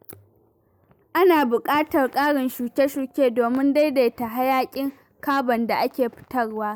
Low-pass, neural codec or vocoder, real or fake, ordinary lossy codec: none; none; real; none